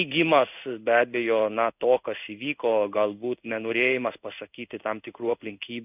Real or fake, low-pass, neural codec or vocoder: fake; 3.6 kHz; codec, 16 kHz in and 24 kHz out, 1 kbps, XY-Tokenizer